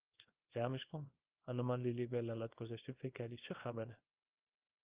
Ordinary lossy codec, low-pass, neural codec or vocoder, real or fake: Opus, 32 kbps; 3.6 kHz; codec, 24 kHz, 0.9 kbps, WavTokenizer, small release; fake